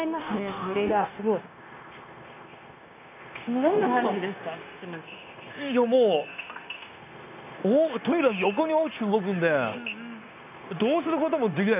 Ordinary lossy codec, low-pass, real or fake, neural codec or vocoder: none; 3.6 kHz; fake; codec, 16 kHz, 0.9 kbps, LongCat-Audio-Codec